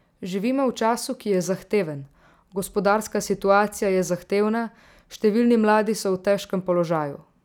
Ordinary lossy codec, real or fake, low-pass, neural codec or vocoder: none; real; 19.8 kHz; none